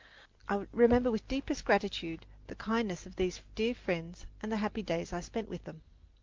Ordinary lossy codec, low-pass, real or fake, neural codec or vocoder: Opus, 32 kbps; 7.2 kHz; real; none